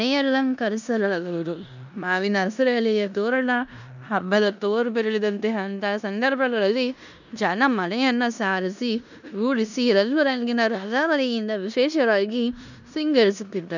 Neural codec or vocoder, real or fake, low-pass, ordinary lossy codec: codec, 16 kHz in and 24 kHz out, 0.9 kbps, LongCat-Audio-Codec, four codebook decoder; fake; 7.2 kHz; none